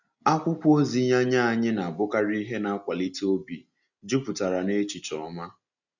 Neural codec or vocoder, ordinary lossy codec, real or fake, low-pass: none; none; real; 7.2 kHz